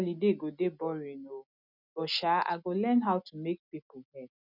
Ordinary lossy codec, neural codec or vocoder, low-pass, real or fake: none; none; 5.4 kHz; real